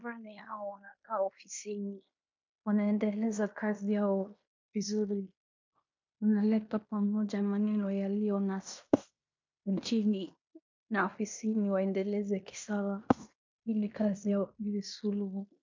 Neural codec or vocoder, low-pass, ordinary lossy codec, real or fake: codec, 16 kHz in and 24 kHz out, 0.9 kbps, LongCat-Audio-Codec, fine tuned four codebook decoder; 7.2 kHz; MP3, 48 kbps; fake